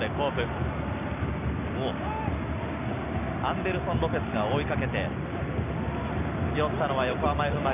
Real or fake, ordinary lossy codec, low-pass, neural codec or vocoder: real; none; 3.6 kHz; none